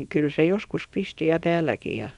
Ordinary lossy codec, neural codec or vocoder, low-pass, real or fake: none; codec, 24 kHz, 0.9 kbps, WavTokenizer, small release; 10.8 kHz; fake